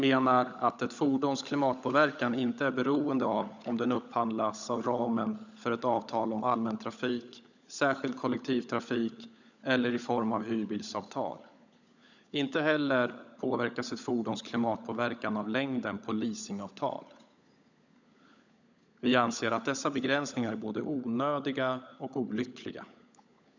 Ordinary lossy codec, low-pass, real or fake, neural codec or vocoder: none; 7.2 kHz; fake; codec, 16 kHz, 16 kbps, FunCodec, trained on LibriTTS, 50 frames a second